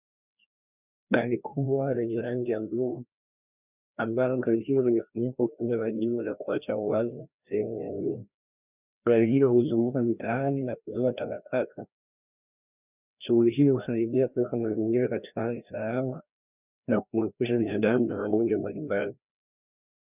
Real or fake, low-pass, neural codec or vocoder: fake; 3.6 kHz; codec, 16 kHz, 1 kbps, FreqCodec, larger model